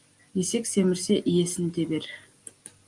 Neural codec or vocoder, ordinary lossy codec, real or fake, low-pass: none; Opus, 32 kbps; real; 10.8 kHz